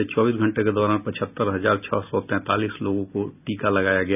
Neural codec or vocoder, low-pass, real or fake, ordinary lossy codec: none; 3.6 kHz; real; none